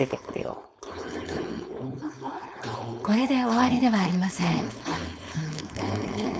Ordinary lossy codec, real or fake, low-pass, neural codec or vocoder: none; fake; none; codec, 16 kHz, 4.8 kbps, FACodec